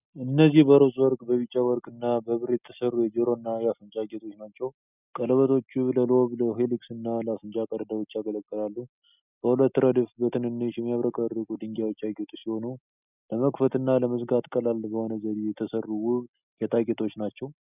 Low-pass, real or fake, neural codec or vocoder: 3.6 kHz; real; none